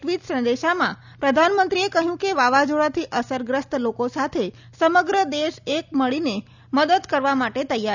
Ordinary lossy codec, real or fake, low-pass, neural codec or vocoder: none; real; 7.2 kHz; none